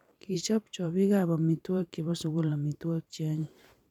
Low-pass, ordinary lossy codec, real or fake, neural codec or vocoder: 19.8 kHz; none; fake; vocoder, 44.1 kHz, 128 mel bands every 512 samples, BigVGAN v2